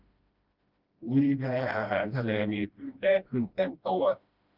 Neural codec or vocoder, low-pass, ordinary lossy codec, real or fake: codec, 16 kHz, 1 kbps, FreqCodec, smaller model; 5.4 kHz; Opus, 24 kbps; fake